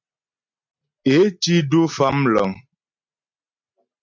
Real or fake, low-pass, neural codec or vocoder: real; 7.2 kHz; none